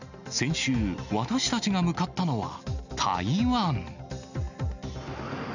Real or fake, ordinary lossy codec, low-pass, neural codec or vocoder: real; none; 7.2 kHz; none